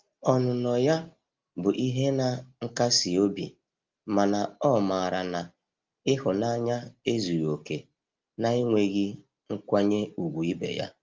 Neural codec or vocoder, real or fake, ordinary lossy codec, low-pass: none; real; Opus, 32 kbps; 7.2 kHz